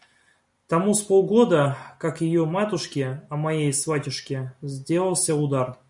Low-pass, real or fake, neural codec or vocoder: 10.8 kHz; real; none